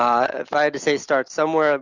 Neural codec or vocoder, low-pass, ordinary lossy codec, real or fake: none; 7.2 kHz; Opus, 64 kbps; real